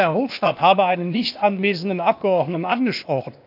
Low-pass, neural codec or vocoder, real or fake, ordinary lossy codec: 5.4 kHz; codec, 16 kHz, 0.8 kbps, ZipCodec; fake; none